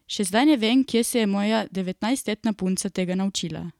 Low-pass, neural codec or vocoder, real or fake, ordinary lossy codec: 19.8 kHz; none; real; none